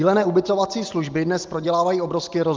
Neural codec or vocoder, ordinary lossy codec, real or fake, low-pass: none; Opus, 32 kbps; real; 7.2 kHz